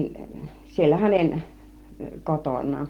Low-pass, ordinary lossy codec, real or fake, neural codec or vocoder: 19.8 kHz; Opus, 16 kbps; real; none